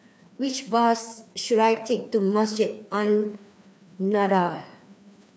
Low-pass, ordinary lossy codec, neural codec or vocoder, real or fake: none; none; codec, 16 kHz, 2 kbps, FreqCodec, larger model; fake